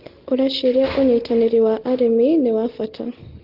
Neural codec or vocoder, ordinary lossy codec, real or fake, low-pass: none; Opus, 16 kbps; real; 5.4 kHz